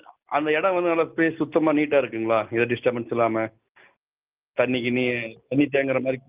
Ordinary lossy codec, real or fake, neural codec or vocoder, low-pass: Opus, 24 kbps; real; none; 3.6 kHz